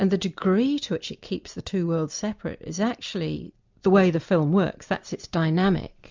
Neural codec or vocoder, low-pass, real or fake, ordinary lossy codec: none; 7.2 kHz; real; MP3, 64 kbps